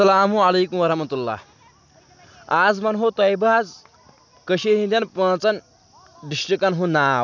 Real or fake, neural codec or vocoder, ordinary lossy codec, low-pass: real; none; none; 7.2 kHz